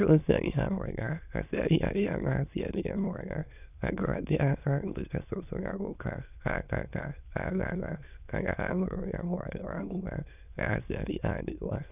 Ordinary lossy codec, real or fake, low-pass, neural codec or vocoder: none; fake; 3.6 kHz; autoencoder, 22.05 kHz, a latent of 192 numbers a frame, VITS, trained on many speakers